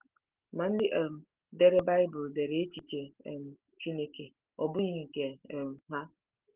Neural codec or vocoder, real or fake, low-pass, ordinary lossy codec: none; real; 3.6 kHz; Opus, 16 kbps